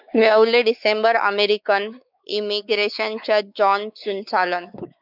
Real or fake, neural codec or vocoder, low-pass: fake; codec, 16 kHz, 4 kbps, X-Codec, WavLM features, trained on Multilingual LibriSpeech; 5.4 kHz